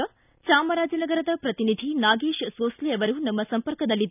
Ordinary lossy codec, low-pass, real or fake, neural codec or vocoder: none; 3.6 kHz; real; none